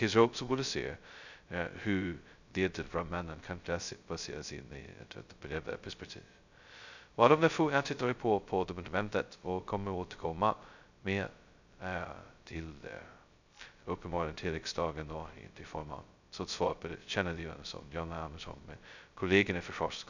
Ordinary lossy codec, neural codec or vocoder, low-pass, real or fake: none; codec, 16 kHz, 0.2 kbps, FocalCodec; 7.2 kHz; fake